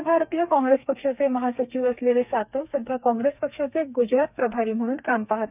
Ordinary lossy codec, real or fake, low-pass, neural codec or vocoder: none; fake; 3.6 kHz; codec, 32 kHz, 1.9 kbps, SNAC